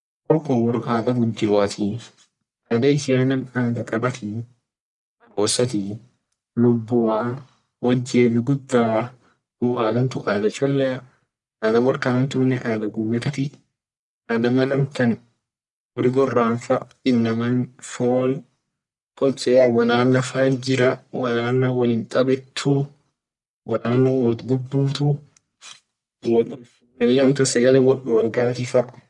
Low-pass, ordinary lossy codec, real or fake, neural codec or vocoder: 10.8 kHz; none; fake; codec, 44.1 kHz, 1.7 kbps, Pupu-Codec